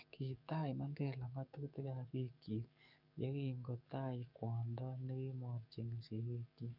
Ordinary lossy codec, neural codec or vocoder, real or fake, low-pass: none; codec, 44.1 kHz, 7.8 kbps, DAC; fake; 5.4 kHz